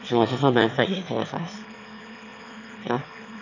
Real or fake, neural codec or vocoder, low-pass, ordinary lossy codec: fake; autoencoder, 22.05 kHz, a latent of 192 numbers a frame, VITS, trained on one speaker; 7.2 kHz; none